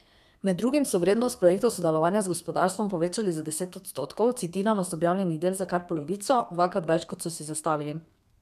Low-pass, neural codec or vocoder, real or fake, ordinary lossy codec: 14.4 kHz; codec, 32 kHz, 1.9 kbps, SNAC; fake; none